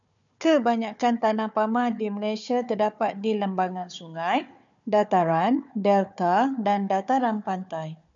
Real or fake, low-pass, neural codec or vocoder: fake; 7.2 kHz; codec, 16 kHz, 4 kbps, FunCodec, trained on Chinese and English, 50 frames a second